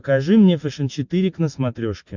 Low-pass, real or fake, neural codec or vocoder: 7.2 kHz; real; none